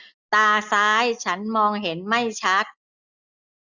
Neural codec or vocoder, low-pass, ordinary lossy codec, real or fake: none; 7.2 kHz; none; real